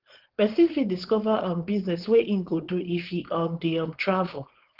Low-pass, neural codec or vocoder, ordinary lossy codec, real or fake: 5.4 kHz; codec, 16 kHz, 4.8 kbps, FACodec; Opus, 16 kbps; fake